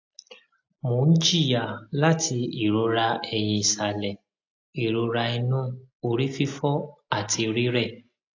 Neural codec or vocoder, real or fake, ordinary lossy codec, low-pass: none; real; AAC, 48 kbps; 7.2 kHz